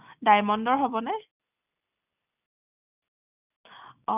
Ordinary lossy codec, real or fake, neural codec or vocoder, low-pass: none; real; none; 3.6 kHz